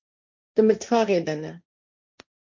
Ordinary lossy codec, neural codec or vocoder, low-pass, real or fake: MP3, 48 kbps; codec, 16 kHz, 1.1 kbps, Voila-Tokenizer; 7.2 kHz; fake